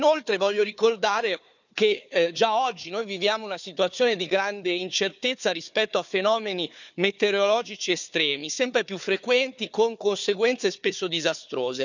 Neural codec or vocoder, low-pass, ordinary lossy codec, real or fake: codec, 16 kHz, 4 kbps, FunCodec, trained on Chinese and English, 50 frames a second; 7.2 kHz; none; fake